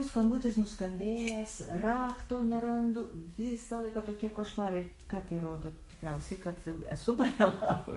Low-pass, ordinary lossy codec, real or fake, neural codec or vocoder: 14.4 kHz; MP3, 48 kbps; fake; codec, 32 kHz, 1.9 kbps, SNAC